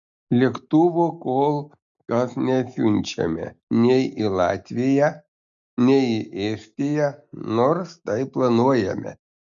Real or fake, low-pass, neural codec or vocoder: real; 7.2 kHz; none